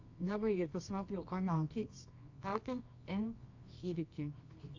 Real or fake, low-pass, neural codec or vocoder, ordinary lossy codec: fake; 7.2 kHz; codec, 24 kHz, 0.9 kbps, WavTokenizer, medium music audio release; AAC, 48 kbps